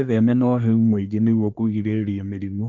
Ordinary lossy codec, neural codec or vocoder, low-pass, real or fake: Opus, 24 kbps; codec, 24 kHz, 1 kbps, SNAC; 7.2 kHz; fake